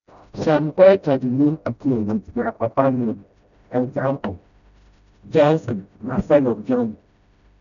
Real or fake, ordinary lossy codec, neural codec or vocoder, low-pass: fake; none; codec, 16 kHz, 0.5 kbps, FreqCodec, smaller model; 7.2 kHz